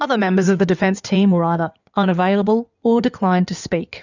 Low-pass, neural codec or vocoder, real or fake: 7.2 kHz; codec, 16 kHz in and 24 kHz out, 2.2 kbps, FireRedTTS-2 codec; fake